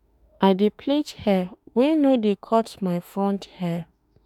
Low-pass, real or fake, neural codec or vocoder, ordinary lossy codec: 19.8 kHz; fake; autoencoder, 48 kHz, 32 numbers a frame, DAC-VAE, trained on Japanese speech; none